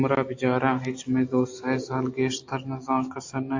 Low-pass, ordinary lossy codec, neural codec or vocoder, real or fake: 7.2 kHz; AAC, 48 kbps; none; real